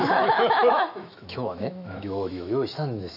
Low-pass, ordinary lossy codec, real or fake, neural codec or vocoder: 5.4 kHz; none; fake; autoencoder, 48 kHz, 128 numbers a frame, DAC-VAE, trained on Japanese speech